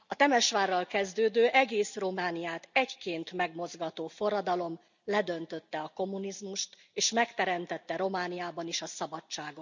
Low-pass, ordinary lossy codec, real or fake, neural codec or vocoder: 7.2 kHz; none; real; none